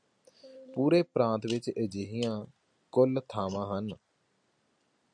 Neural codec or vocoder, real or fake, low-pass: none; real; 9.9 kHz